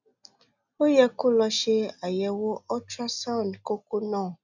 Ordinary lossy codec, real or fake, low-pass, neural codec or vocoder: none; real; 7.2 kHz; none